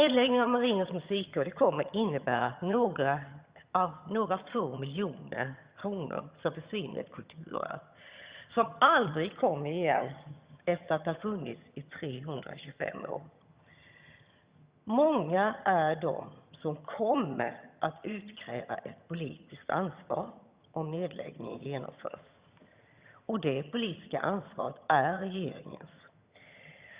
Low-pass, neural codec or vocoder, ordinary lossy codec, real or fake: 3.6 kHz; vocoder, 22.05 kHz, 80 mel bands, HiFi-GAN; Opus, 64 kbps; fake